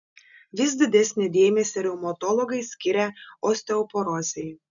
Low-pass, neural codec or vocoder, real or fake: 7.2 kHz; none; real